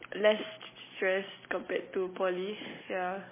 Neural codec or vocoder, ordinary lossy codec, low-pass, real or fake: none; MP3, 16 kbps; 3.6 kHz; real